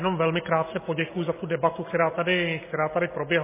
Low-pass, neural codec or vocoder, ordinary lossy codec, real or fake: 3.6 kHz; none; MP3, 16 kbps; real